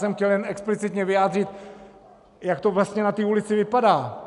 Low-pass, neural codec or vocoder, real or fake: 10.8 kHz; none; real